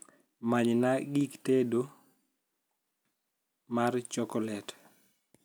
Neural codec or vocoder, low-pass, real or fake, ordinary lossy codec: none; none; real; none